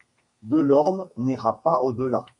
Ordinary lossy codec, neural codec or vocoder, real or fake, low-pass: MP3, 48 kbps; codec, 32 kHz, 1.9 kbps, SNAC; fake; 10.8 kHz